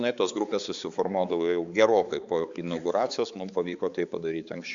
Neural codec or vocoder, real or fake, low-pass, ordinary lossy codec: codec, 16 kHz, 4 kbps, X-Codec, HuBERT features, trained on balanced general audio; fake; 7.2 kHz; Opus, 64 kbps